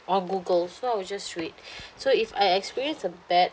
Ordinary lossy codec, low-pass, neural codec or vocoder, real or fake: none; none; none; real